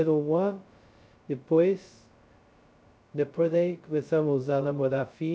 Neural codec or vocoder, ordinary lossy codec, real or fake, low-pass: codec, 16 kHz, 0.2 kbps, FocalCodec; none; fake; none